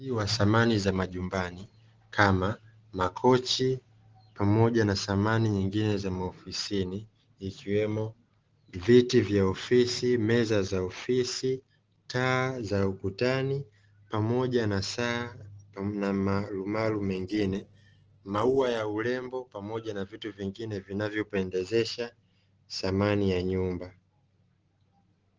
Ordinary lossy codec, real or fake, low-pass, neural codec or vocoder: Opus, 16 kbps; real; 7.2 kHz; none